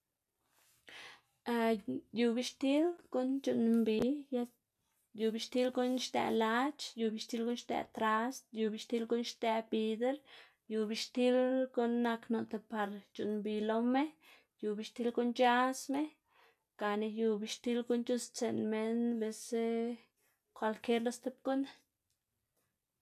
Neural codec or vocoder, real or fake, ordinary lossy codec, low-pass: none; real; none; 14.4 kHz